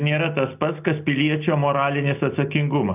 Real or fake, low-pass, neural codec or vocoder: real; 3.6 kHz; none